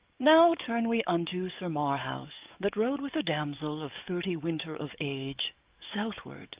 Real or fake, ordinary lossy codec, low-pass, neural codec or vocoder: fake; Opus, 32 kbps; 3.6 kHz; codec, 44.1 kHz, 7.8 kbps, DAC